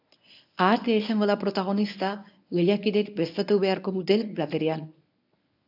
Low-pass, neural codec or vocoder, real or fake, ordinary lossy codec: 5.4 kHz; codec, 24 kHz, 0.9 kbps, WavTokenizer, medium speech release version 1; fake; AAC, 48 kbps